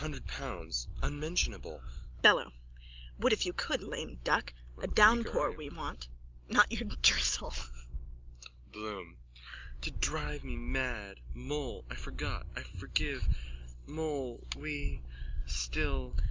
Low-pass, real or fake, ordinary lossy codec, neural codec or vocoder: 7.2 kHz; real; Opus, 32 kbps; none